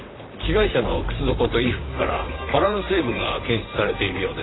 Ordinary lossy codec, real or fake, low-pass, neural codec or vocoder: AAC, 16 kbps; fake; 7.2 kHz; vocoder, 44.1 kHz, 128 mel bands, Pupu-Vocoder